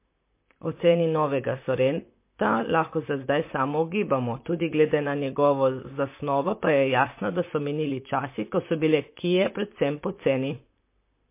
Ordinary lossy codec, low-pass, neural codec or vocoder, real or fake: MP3, 24 kbps; 3.6 kHz; vocoder, 44.1 kHz, 128 mel bands, Pupu-Vocoder; fake